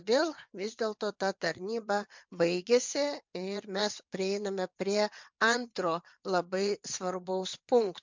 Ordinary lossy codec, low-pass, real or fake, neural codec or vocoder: MP3, 64 kbps; 7.2 kHz; fake; vocoder, 22.05 kHz, 80 mel bands, WaveNeXt